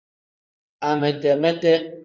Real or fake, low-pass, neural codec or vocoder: fake; 7.2 kHz; codec, 16 kHz in and 24 kHz out, 2.2 kbps, FireRedTTS-2 codec